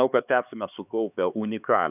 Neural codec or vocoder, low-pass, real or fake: codec, 16 kHz, 4 kbps, X-Codec, HuBERT features, trained on LibriSpeech; 3.6 kHz; fake